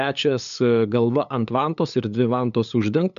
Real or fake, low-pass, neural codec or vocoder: fake; 7.2 kHz; codec, 16 kHz, 8 kbps, FunCodec, trained on LibriTTS, 25 frames a second